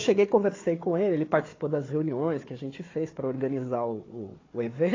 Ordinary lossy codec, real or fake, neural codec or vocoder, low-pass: AAC, 32 kbps; fake; codec, 16 kHz, 4 kbps, FunCodec, trained on Chinese and English, 50 frames a second; 7.2 kHz